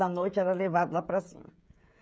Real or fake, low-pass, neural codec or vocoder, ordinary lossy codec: fake; none; codec, 16 kHz, 16 kbps, FreqCodec, smaller model; none